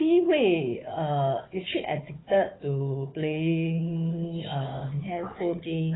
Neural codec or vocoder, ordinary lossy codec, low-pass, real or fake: codec, 16 kHz, 4 kbps, FunCodec, trained on Chinese and English, 50 frames a second; AAC, 16 kbps; 7.2 kHz; fake